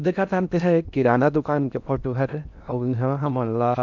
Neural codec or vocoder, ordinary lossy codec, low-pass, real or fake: codec, 16 kHz in and 24 kHz out, 0.6 kbps, FocalCodec, streaming, 4096 codes; none; 7.2 kHz; fake